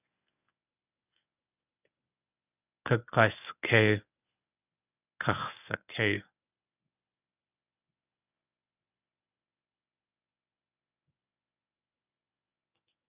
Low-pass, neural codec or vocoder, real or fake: 3.6 kHz; codec, 24 kHz, 0.9 kbps, WavTokenizer, medium speech release version 1; fake